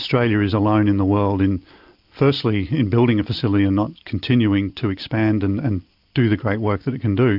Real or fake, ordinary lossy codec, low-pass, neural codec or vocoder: real; AAC, 48 kbps; 5.4 kHz; none